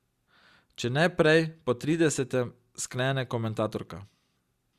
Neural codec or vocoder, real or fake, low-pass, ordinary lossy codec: none; real; 14.4 kHz; Opus, 64 kbps